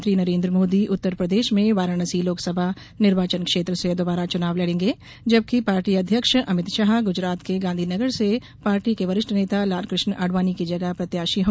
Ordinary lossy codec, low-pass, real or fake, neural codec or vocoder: none; none; real; none